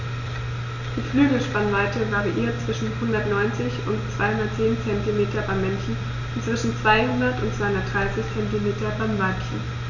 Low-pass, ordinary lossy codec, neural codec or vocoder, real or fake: 7.2 kHz; none; none; real